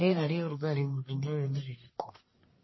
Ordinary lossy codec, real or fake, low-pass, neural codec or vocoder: MP3, 24 kbps; fake; 7.2 kHz; codec, 44.1 kHz, 1.7 kbps, Pupu-Codec